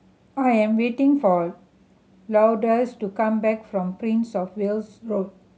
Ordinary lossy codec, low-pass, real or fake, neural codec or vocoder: none; none; real; none